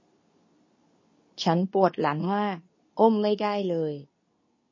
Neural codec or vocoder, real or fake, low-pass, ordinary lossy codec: codec, 24 kHz, 0.9 kbps, WavTokenizer, medium speech release version 2; fake; 7.2 kHz; MP3, 32 kbps